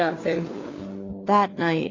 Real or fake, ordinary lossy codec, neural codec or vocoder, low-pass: fake; AAC, 32 kbps; codec, 16 kHz, 4 kbps, FunCodec, trained on LibriTTS, 50 frames a second; 7.2 kHz